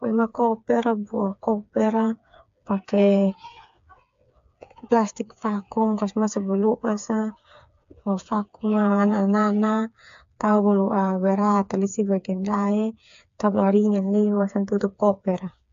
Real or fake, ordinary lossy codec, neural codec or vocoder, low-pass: fake; AAC, 64 kbps; codec, 16 kHz, 4 kbps, FreqCodec, smaller model; 7.2 kHz